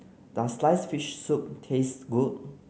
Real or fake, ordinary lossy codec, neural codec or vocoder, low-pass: real; none; none; none